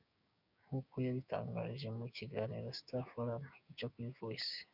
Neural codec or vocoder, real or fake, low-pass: codec, 16 kHz, 6 kbps, DAC; fake; 5.4 kHz